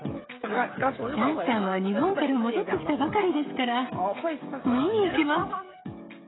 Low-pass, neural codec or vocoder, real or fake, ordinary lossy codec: 7.2 kHz; codec, 16 kHz, 16 kbps, FreqCodec, smaller model; fake; AAC, 16 kbps